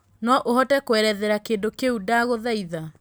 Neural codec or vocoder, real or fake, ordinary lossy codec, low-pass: none; real; none; none